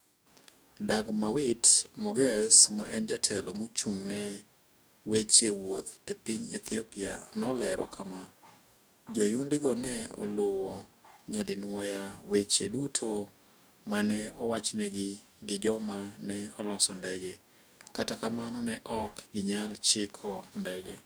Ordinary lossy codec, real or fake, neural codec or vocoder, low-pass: none; fake; codec, 44.1 kHz, 2.6 kbps, DAC; none